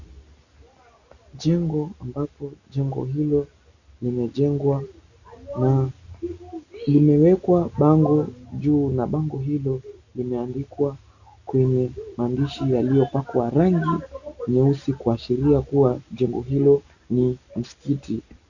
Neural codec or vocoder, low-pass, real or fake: none; 7.2 kHz; real